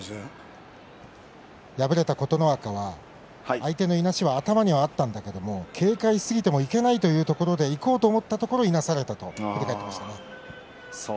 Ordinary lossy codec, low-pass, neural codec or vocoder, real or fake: none; none; none; real